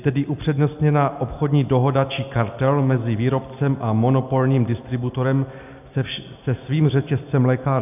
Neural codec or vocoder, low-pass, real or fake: none; 3.6 kHz; real